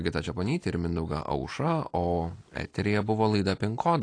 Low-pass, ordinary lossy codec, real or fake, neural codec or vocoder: 9.9 kHz; AAC, 48 kbps; real; none